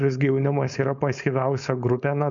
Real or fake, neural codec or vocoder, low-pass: fake; codec, 16 kHz, 4.8 kbps, FACodec; 7.2 kHz